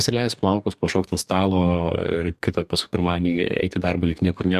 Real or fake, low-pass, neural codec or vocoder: fake; 14.4 kHz; codec, 44.1 kHz, 2.6 kbps, DAC